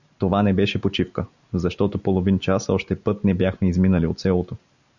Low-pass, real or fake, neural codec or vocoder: 7.2 kHz; real; none